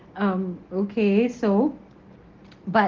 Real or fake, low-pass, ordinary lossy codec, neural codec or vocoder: real; 7.2 kHz; Opus, 16 kbps; none